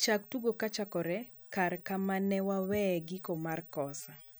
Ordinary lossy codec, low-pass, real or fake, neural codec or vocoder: none; none; real; none